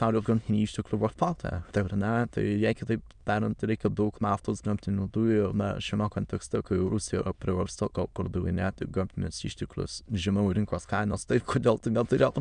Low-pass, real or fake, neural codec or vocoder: 9.9 kHz; fake; autoencoder, 22.05 kHz, a latent of 192 numbers a frame, VITS, trained on many speakers